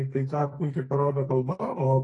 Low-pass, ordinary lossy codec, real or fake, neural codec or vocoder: 10.8 kHz; AAC, 32 kbps; fake; codec, 44.1 kHz, 2.6 kbps, SNAC